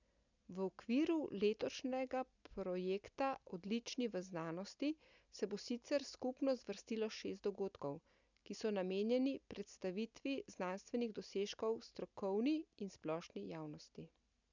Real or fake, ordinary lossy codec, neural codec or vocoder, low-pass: real; none; none; 7.2 kHz